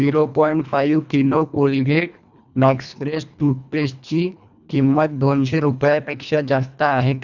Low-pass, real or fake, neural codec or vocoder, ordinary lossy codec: 7.2 kHz; fake; codec, 24 kHz, 1.5 kbps, HILCodec; none